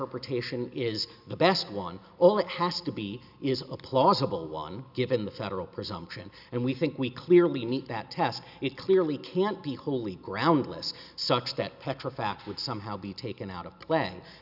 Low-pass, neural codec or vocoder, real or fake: 5.4 kHz; none; real